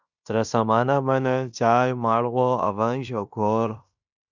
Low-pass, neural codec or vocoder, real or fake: 7.2 kHz; codec, 16 kHz in and 24 kHz out, 0.9 kbps, LongCat-Audio-Codec, fine tuned four codebook decoder; fake